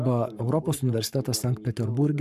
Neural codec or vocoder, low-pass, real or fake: codec, 44.1 kHz, 7.8 kbps, Pupu-Codec; 14.4 kHz; fake